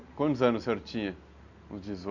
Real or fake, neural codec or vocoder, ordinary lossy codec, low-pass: real; none; none; 7.2 kHz